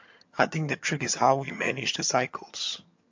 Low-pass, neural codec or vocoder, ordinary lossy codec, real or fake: 7.2 kHz; vocoder, 22.05 kHz, 80 mel bands, HiFi-GAN; MP3, 48 kbps; fake